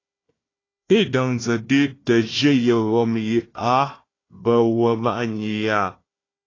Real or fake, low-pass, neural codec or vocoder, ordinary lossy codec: fake; 7.2 kHz; codec, 16 kHz, 1 kbps, FunCodec, trained on Chinese and English, 50 frames a second; AAC, 32 kbps